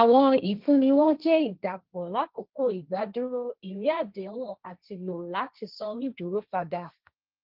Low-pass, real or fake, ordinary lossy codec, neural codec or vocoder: 5.4 kHz; fake; Opus, 32 kbps; codec, 16 kHz, 1.1 kbps, Voila-Tokenizer